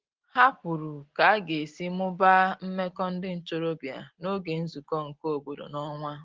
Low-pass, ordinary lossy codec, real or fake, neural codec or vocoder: 7.2 kHz; Opus, 16 kbps; real; none